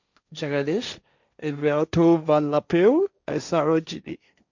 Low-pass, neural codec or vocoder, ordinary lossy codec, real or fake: 7.2 kHz; codec, 16 kHz, 1.1 kbps, Voila-Tokenizer; none; fake